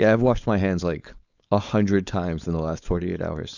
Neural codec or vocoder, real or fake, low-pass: codec, 16 kHz, 4.8 kbps, FACodec; fake; 7.2 kHz